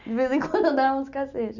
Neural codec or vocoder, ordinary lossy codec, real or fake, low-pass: none; none; real; 7.2 kHz